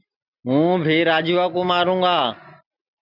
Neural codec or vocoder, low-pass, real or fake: none; 5.4 kHz; real